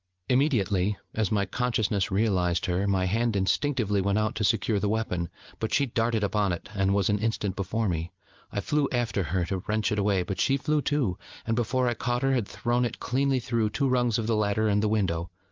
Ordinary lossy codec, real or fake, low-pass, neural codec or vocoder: Opus, 32 kbps; real; 7.2 kHz; none